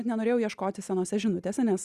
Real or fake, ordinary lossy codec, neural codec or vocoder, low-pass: real; Opus, 64 kbps; none; 14.4 kHz